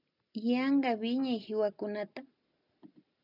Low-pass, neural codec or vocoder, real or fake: 5.4 kHz; none; real